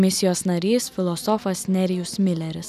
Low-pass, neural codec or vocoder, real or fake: 14.4 kHz; none; real